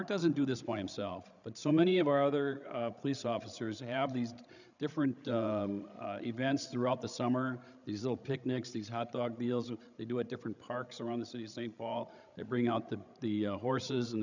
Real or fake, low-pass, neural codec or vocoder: fake; 7.2 kHz; codec, 16 kHz, 16 kbps, FreqCodec, larger model